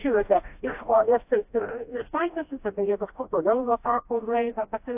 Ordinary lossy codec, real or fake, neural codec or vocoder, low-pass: AAC, 32 kbps; fake; codec, 16 kHz, 1 kbps, FreqCodec, smaller model; 3.6 kHz